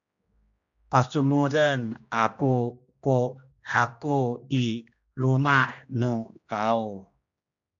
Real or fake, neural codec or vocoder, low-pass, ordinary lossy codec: fake; codec, 16 kHz, 1 kbps, X-Codec, HuBERT features, trained on general audio; 7.2 kHz; AAC, 64 kbps